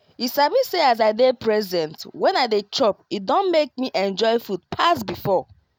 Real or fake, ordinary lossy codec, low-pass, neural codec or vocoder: real; none; none; none